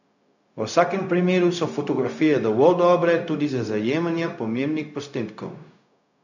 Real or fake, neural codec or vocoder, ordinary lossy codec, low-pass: fake; codec, 16 kHz, 0.4 kbps, LongCat-Audio-Codec; none; 7.2 kHz